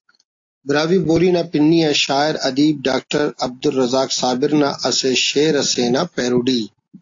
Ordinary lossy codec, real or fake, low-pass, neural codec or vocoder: AAC, 48 kbps; real; 7.2 kHz; none